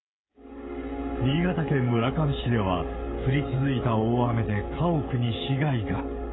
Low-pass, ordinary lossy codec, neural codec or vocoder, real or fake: 7.2 kHz; AAC, 16 kbps; codec, 16 kHz, 16 kbps, FreqCodec, smaller model; fake